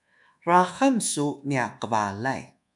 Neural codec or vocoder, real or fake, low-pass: codec, 24 kHz, 1.2 kbps, DualCodec; fake; 10.8 kHz